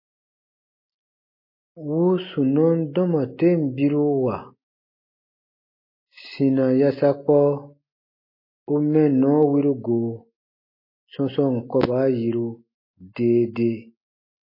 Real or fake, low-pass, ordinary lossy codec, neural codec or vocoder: real; 5.4 kHz; MP3, 24 kbps; none